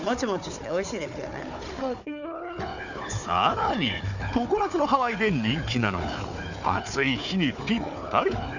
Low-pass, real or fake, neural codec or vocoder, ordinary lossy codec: 7.2 kHz; fake; codec, 16 kHz, 4 kbps, FunCodec, trained on Chinese and English, 50 frames a second; none